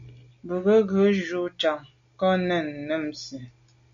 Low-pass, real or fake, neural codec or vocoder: 7.2 kHz; real; none